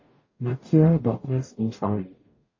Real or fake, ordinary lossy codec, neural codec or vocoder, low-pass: fake; MP3, 32 kbps; codec, 44.1 kHz, 0.9 kbps, DAC; 7.2 kHz